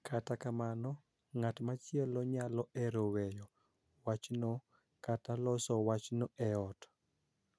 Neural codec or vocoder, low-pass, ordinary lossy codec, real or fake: none; none; none; real